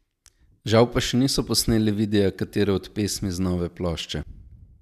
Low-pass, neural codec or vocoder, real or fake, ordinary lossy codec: 14.4 kHz; none; real; none